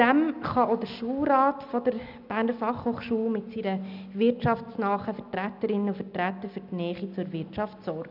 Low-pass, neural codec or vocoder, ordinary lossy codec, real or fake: 5.4 kHz; none; none; real